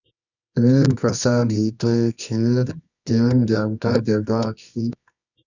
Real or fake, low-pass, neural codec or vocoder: fake; 7.2 kHz; codec, 24 kHz, 0.9 kbps, WavTokenizer, medium music audio release